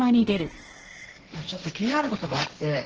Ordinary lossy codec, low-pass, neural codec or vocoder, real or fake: Opus, 16 kbps; 7.2 kHz; codec, 16 kHz, 1.1 kbps, Voila-Tokenizer; fake